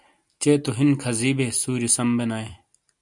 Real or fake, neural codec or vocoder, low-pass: real; none; 10.8 kHz